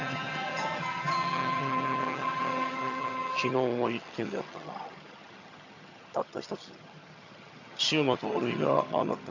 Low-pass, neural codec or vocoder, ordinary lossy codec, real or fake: 7.2 kHz; vocoder, 22.05 kHz, 80 mel bands, HiFi-GAN; none; fake